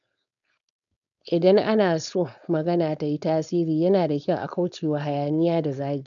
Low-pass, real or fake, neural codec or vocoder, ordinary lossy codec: 7.2 kHz; fake; codec, 16 kHz, 4.8 kbps, FACodec; none